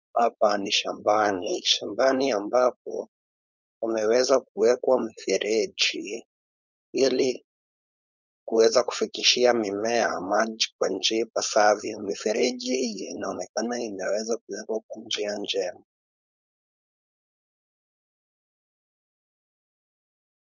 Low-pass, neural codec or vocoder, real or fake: 7.2 kHz; codec, 16 kHz, 4.8 kbps, FACodec; fake